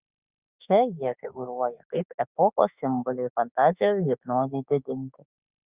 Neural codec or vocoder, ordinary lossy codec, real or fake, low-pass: autoencoder, 48 kHz, 32 numbers a frame, DAC-VAE, trained on Japanese speech; Opus, 64 kbps; fake; 3.6 kHz